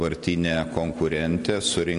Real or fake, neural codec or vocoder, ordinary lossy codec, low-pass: real; none; AAC, 48 kbps; 10.8 kHz